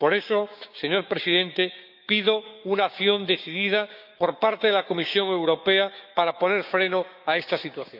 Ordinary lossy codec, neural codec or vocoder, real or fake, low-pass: none; codec, 16 kHz, 6 kbps, DAC; fake; 5.4 kHz